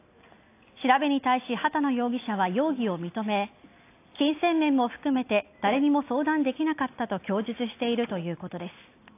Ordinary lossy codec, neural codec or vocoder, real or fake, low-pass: AAC, 24 kbps; none; real; 3.6 kHz